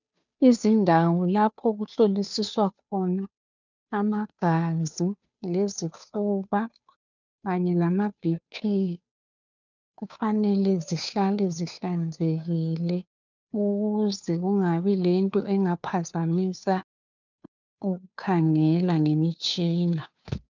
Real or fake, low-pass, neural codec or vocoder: fake; 7.2 kHz; codec, 16 kHz, 2 kbps, FunCodec, trained on Chinese and English, 25 frames a second